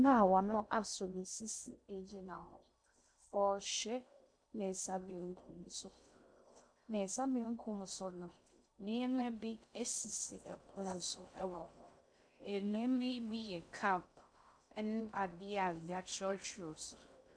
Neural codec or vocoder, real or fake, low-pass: codec, 16 kHz in and 24 kHz out, 0.6 kbps, FocalCodec, streaming, 2048 codes; fake; 9.9 kHz